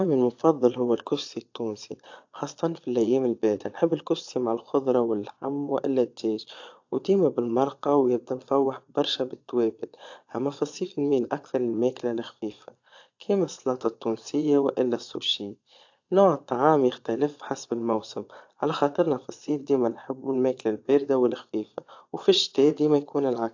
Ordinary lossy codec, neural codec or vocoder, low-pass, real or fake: AAC, 48 kbps; vocoder, 22.05 kHz, 80 mel bands, Vocos; 7.2 kHz; fake